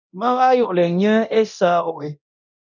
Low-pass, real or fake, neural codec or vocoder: 7.2 kHz; fake; codec, 24 kHz, 0.9 kbps, DualCodec